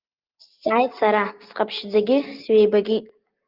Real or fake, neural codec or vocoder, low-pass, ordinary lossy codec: real; none; 5.4 kHz; Opus, 24 kbps